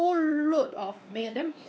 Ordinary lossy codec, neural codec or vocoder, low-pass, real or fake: none; codec, 16 kHz, 2 kbps, X-Codec, WavLM features, trained on Multilingual LibriSpeech; none; fake